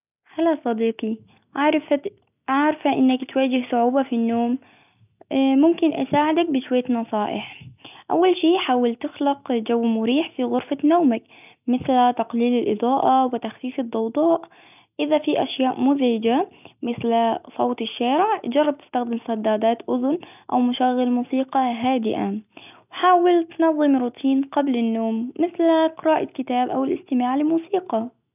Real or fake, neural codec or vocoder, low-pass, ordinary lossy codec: real; none; 3.6 kHz; none